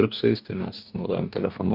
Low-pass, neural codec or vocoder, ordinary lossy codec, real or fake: 5.4 kHz; codec, 44.1 kHz, 2.6 kbps, DAC; MP3, 48 kbps; fake